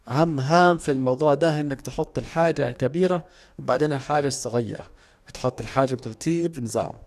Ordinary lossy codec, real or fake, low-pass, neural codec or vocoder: none; fake; 14.4 kHz; codec, 44.1 kHz, 2.6 kbps, DAC